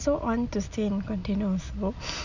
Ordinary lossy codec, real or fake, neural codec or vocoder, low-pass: none; real; none; 7.2 kHz